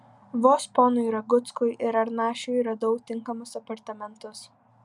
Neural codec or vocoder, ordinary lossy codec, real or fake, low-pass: none; MP3, 96 kbps; real; 10.8 kHz